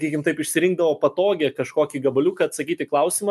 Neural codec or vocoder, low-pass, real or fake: none; 14.4 kHz; real